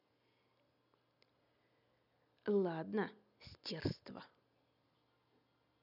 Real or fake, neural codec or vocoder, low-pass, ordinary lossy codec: real; none; 5.4 kHz; none